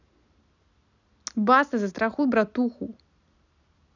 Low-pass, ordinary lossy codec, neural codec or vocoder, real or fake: 7.2 kHz; none; none; real